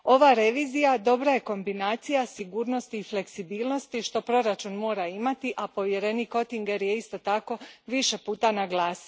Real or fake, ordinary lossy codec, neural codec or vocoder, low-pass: real; none; none; none